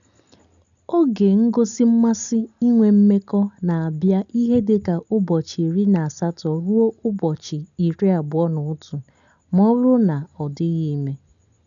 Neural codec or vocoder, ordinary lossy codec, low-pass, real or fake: none; none; 7.2 kHz; real